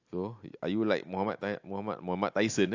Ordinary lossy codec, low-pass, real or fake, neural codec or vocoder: AAC, 48 kbps; 7.2 kHz; real; none